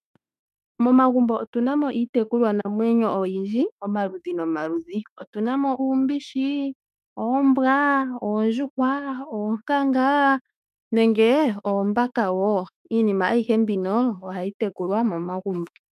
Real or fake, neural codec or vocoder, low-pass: fake; autoencoder, 48 kHz, 32 numbers a frame, DAC-VAE, trained on Japanese speech; 14.4 kHz